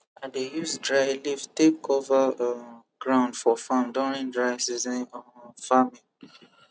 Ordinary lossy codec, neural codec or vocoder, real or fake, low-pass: none; none; real; none